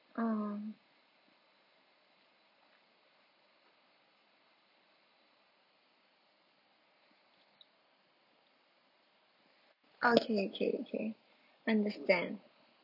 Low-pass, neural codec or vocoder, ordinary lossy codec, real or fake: 5.4 kHz; none; none; real